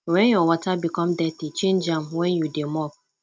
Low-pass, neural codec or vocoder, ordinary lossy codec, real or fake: none; none; none; real